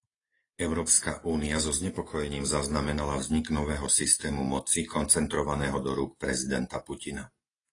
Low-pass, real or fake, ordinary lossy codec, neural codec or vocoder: 10.8 kHz; real; AAC, 32 kbps; none